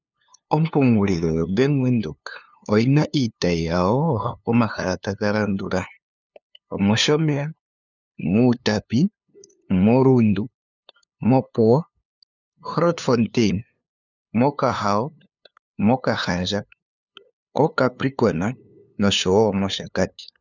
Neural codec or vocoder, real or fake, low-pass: codec, 16 kHz, 2 kbps, FunCodec, trained on LibriTTS, 25 frames a second; fake; 7.2 kHz